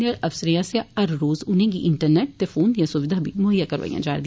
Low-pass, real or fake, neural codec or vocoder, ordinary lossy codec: none; real; none; none